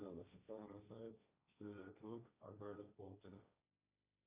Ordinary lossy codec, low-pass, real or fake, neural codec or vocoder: Opus, 64 kbps; 3.6 kHz; fake; codec, 16 kHz, 1.1 kbps, Voila-Tokenizer